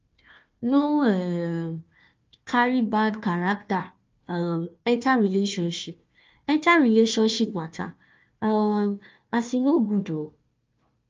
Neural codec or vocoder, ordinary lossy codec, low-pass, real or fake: codec, 16 kHz, 1 kbps, FunCodec, trained on Chinese and English, 50 frames a second; Opus, 24 kbps; 7.2 kHz; fake